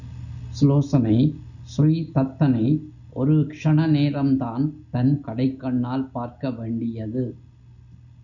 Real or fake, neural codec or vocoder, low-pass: real; none; 7.2 kHz